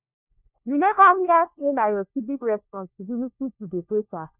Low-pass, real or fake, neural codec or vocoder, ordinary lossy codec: 3.6 kHz; fake; codec, 16 kHz, 1 kbps, FunCodec, trained on LibriTTS, 50 frames a second; none